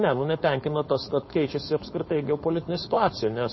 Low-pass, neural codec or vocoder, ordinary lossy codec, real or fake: 7.2 kHz; codec, 16 kHz, 4.8 kbps, FACodec; MP3, 24 kbps; fake